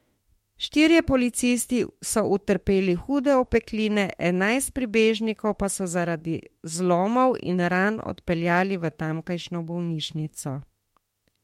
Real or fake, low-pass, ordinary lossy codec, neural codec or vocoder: fake; 19.8 kHz; MP3, 64 kbps; autoencoder, 48 kHz, 32 numbers a frame, DAC-VAE, trained on Japanese speech